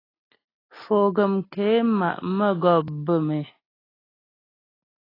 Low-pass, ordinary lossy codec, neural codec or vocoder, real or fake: 5.4 kHz; AAC, 24 kbps; none; real